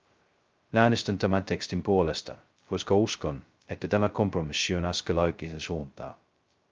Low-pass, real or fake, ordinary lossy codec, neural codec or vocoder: 7.2 kHz; fake; Opus, 24 kbps; codec, 16 kHz, 0.2 kbps, FocalCodec